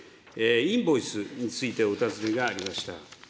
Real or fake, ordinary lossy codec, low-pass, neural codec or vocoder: real; none; none; none